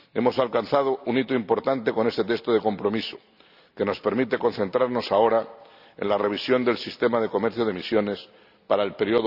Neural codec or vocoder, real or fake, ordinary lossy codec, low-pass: none; real; none; 5.4 kHz